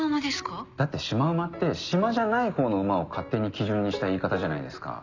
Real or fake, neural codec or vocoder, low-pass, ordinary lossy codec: real; none; 7.2 kHz; none